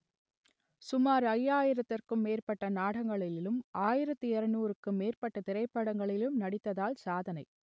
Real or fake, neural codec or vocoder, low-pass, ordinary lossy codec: real; none; none; none